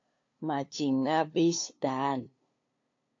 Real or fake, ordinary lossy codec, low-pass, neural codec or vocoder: fake; AAC, 48 kbps; 7.2 kHz; codec, 16 kHz, 2 kbps, FunCodec, trained on LibriTTS, 25 frames a second